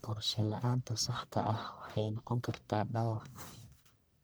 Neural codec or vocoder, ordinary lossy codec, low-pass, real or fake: codec, 44.1 kHz, 1.7 kbps, Pupu-Codec; none; none; fake